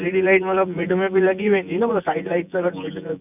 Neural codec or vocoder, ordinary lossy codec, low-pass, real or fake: vocoder, 24 kHz, 100 mel bands, Vocos; none; 3.6 kHz; fake